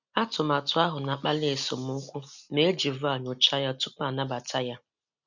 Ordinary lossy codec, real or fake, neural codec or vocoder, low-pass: none; real; none; 7.2 kHz